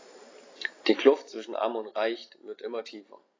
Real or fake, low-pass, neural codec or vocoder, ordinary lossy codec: real; 7.2 kHz; none; AAC, 32 kbps